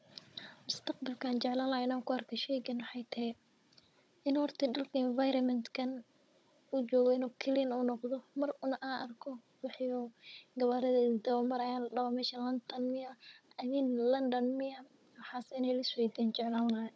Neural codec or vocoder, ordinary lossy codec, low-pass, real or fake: codec, 16 kHz, 16 kbps, FunCodec, trained on Chinese and English, 50 frames a second; none; none; fake